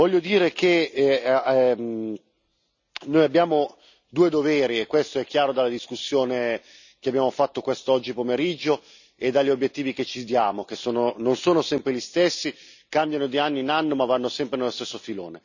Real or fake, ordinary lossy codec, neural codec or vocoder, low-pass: real; none; none; 7.2 kHz